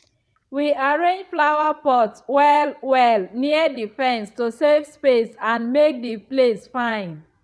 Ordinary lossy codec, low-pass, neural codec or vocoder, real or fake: none; none; vocoder, 22.05 kHz, 80 mel bands, WaveNeXt; fake